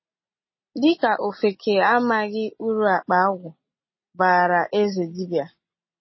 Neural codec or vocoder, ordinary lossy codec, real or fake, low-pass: none; MP3, 24 kbps; real; 7.2 kHz